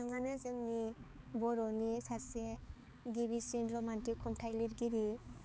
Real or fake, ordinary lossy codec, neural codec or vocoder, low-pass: fake; none; codec, 16 kHz, 4 kbps, X-Codec, HuBERT features, trained on balanced general audio; none